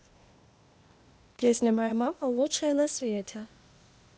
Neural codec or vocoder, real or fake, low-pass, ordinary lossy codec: codec, 16 kHz, 0.8 kbps, ZipCodec; fake; none; none